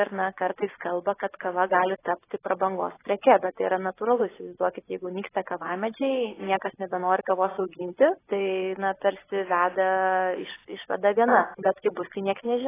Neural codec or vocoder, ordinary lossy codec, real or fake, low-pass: vocoder, 24 kHz, 100 mel bands, Vocos; AAC, 16 kbps; fake; 3.6 kHz